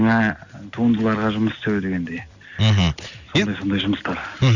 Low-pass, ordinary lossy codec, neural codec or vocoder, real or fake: 7.2 kHz; none; none; real